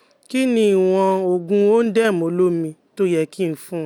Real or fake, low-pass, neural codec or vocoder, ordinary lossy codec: real; 19.8 kHz; none; none